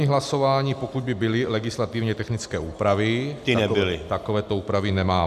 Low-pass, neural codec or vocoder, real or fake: 14.4 kHz; none; real